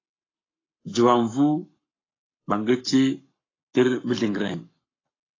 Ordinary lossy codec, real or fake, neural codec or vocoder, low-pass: AAC, 32 kbps; fake; codec, 44.1 kHz, 7.8 kbps, Pupu-Codec; 7.2 kHz